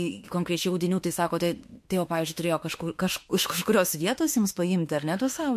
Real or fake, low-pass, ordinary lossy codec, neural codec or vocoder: fake; 14.4 kHz; MP3, 64 kbps; autoencoder, 48 kHz, 32 numbers a frame, DAC-VAE, trained on Japanese speech